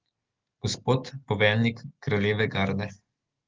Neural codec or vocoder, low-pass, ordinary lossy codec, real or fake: none; 7.2 kHz; Opus, 16 kbps; real